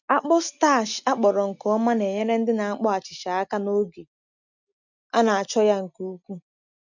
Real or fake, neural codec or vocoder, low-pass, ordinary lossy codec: real; none; 7.2 kHz; none